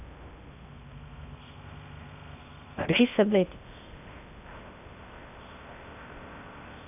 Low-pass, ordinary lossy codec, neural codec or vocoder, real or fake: 3.6 kHz; none; codec, 16 kHz in and 24 kHz out, 0.6 kbps, FocalCodec, streaming, 2048 codes; fake